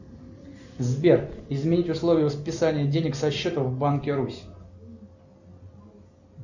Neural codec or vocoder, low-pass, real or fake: none; 7.2 kHz; real